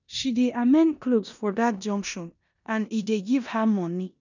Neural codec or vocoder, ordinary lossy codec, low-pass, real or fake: codec, 16 kHz in and 24 kHz out, 0.9 kbps, LongCat-Audio-Codec, four codebook decoder; none; 7.2 kHz; fake